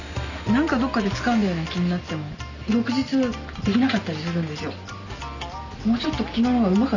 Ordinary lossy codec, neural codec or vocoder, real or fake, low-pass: none; none; real; 7.2 kHz